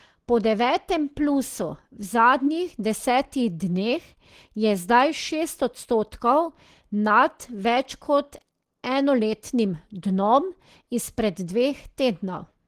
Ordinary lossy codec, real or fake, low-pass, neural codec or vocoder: Opus, 16 kbps; real; 14.4 kHz; none